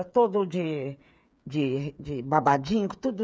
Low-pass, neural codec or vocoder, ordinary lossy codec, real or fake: none; codec, 16 kHz, 8 kbps, FreqCodec, smaller model; none; fake